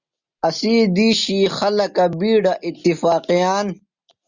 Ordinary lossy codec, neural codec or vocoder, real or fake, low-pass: Opus, 64 kbps; none; real; 7.2 kHz